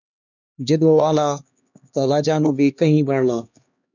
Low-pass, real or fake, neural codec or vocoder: 7.2 kHz; fake; codec, 16 kHz, 2 kbps, X-Codec, HuBERT features, trained on LibriSpeech